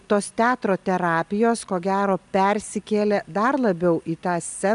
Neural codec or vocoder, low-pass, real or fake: none; 10.8 kHz; real